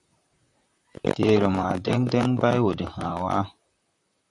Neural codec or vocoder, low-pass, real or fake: vocoder, 44.1 kHz, 128 mel bands, Pupu-Vocoder; 10.8 kHz; fake